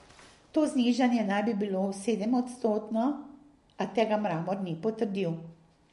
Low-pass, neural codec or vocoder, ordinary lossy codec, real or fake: 14.4 kHz; none; MP3, 48 kbps; real